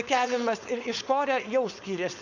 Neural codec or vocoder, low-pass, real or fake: codec, 16 kHz, 4.8 kbps, FACodec; 7.2 kHz; fake